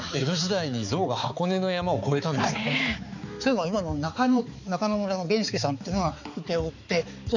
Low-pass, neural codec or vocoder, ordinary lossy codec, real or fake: 7.2 kHz; codec, 16 kHz, 4 kbps, X-Codec, HuBERT features, trained on balanced general audio; none; fake